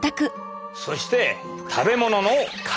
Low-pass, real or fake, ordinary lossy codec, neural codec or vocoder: none; real; none; none